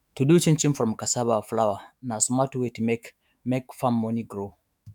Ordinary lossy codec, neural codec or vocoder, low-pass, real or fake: none; autoencoder, 48 kHz, 128 numbers a frame, DAC-VAE, trained on Japanese speech; 19.8 kHz; fake